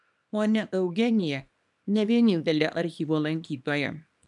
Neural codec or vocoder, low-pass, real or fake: codec, 24 kHz, 0.9 kbps, WavTokenizer, small release; 10.8 kHz; fake